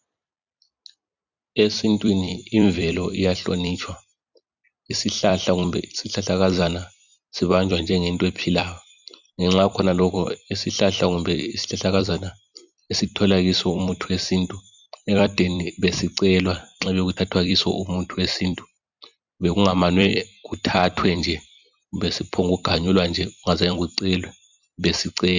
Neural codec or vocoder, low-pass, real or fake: vocoder, 44.1 kHz, 128 mel bands every 256 samples, BigVGAN v2; 7.2 kHz; fake